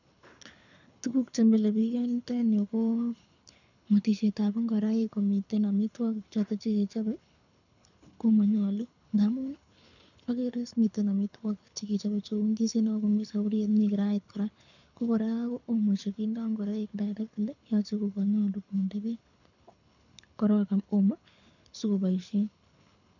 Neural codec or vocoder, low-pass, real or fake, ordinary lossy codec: codec, 24 kHz, 6 kbps, HILCodec; 7.2 kHz; fake; none